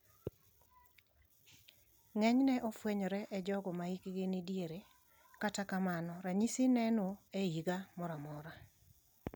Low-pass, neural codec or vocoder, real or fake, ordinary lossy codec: none; none; real; none